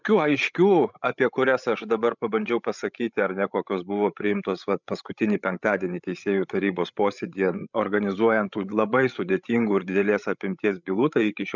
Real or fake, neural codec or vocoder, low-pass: fake; codec, 16 kHz, 16 kbps, FreqCodec, larger model; 7.2 kHz